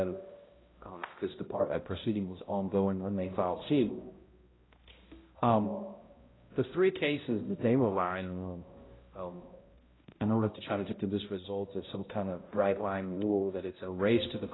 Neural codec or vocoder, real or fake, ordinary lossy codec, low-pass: codec, 16 kHz, 0.5 kbps, X-Codec, HuBERT features, trained on balanced general audio; fake; AAC, 16 kbps; 7.2 kHz